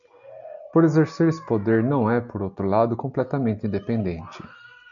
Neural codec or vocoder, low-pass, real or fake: none; 7.2 kHz; real